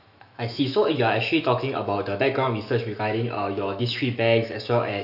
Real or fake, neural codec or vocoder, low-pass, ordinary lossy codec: fake; autoencoder, 48 kHz, 128 numbers a frame, DAC-VAE, trained on Japanese speech; 5.4 kHz; none